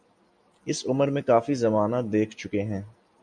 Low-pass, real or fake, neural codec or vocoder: 9.9 kHz; real; none